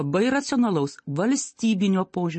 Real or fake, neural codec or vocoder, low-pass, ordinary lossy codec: real; none; 10.8 kHz; MP3, 32 kbps